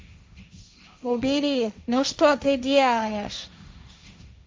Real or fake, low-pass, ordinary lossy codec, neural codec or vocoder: fake; none; none; codec, 16 kHz, 1.1 kbps, Voila-Tokenizer